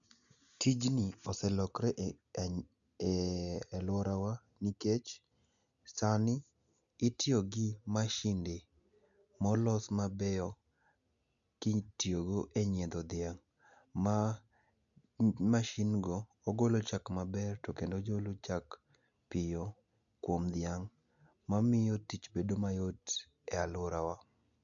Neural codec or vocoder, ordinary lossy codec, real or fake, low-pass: none; none; real; 7.2 kHz